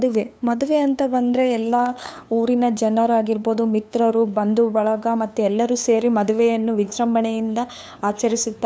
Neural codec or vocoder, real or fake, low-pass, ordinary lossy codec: codec, 16 kHz, 2 kbps, FunCodec, trained on LibriTTS, 25 frames a second; fake; none; none